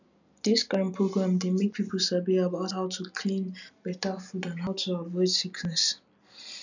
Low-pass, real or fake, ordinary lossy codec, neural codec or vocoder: 7.2 kHz; real; none; none